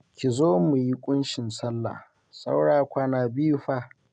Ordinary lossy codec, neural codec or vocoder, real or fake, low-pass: none; none; real; 9.9 kHz